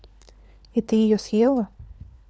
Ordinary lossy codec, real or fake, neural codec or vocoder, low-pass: none; fake; codec, 16 kHz, 4 kbps, FunCodec, trained on LibriTTS, 50 frames a second; none